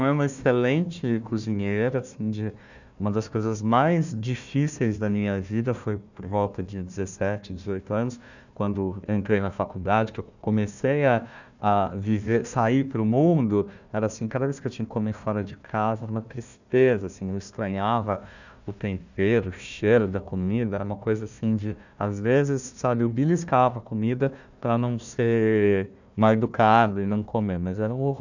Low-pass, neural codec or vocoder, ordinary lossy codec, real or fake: 7.2 kHz; codec, 16 kHz, 1 kbps, FunCodec, trained on Chinese and English, 50 frames a second; none; fake